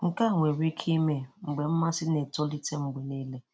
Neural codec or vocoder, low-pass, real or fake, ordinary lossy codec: none; none; real; none